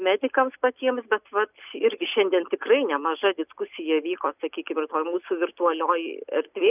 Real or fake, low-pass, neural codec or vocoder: real; 3.6 kHz; none